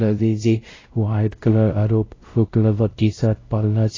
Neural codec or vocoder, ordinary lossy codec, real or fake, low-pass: codec, 16 kHz, 0.5 kbps, X-Codec, WavLM features, trained on Multilingual LibriSpeech; AAC, 32 kbps; fake; 7.2 kHz